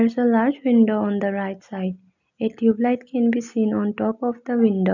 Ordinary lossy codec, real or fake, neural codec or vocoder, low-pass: none; real; none; 7.2 kHz